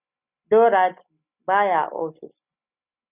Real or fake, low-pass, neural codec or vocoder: real; 3.6 kHz; none